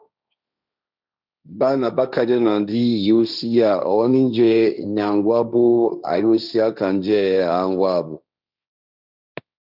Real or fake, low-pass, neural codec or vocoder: fake; 5.4 kHz; codec, 16 kHz, 1.1 kbps, Voila-Tokenizer